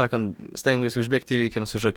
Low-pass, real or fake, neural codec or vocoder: 19.8 kHz; fake; codec, 44.1 kHz, 2.6 kbps, DAC